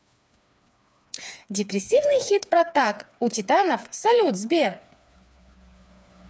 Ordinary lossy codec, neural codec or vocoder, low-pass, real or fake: none; codec, 16 kHz, 4 kbps, FreqCodec, smaller model; none; fake